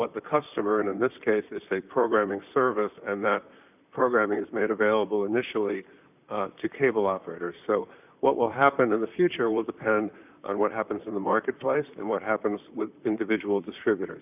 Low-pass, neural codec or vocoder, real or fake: 3.6 kHz; vocoder, 44.1 kHz, 128 mel bands, Pupu-Vocoder; fake